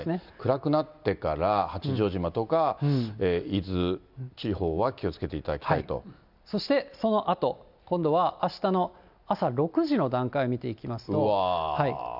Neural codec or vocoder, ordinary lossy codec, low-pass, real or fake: none; none; 5.4 kHz; real